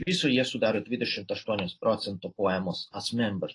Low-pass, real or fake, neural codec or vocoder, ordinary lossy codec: 10.8 kHz; real; none; AAC, 32 kbps